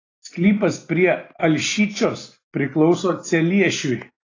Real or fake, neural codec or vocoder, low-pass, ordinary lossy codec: real; none; 7.2 kHz; AAC, 32 kbps